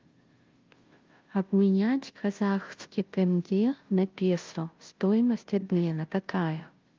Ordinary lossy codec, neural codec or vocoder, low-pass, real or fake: Opus, 32 kbps; codec, 16 kHz, 0.5 kbps, FunCodec, trained on Chinese and English, 25 frames a second; 7.2 kHz; fake